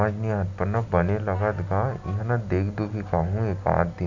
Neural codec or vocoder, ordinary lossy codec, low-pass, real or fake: none; none; 7.2 kHz; real